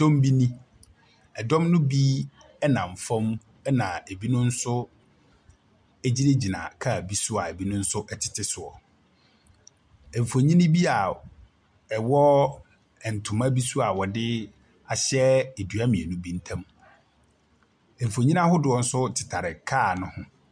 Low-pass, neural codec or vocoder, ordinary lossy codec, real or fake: 9.9 kHz; none; MP3, 96 kbps; real